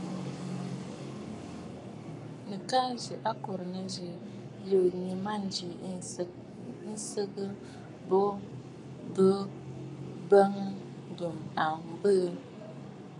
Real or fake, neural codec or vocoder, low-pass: fake; codec, 44.1 kHz, 7.8 kbps, Pupu-Codec; 10.8 kHz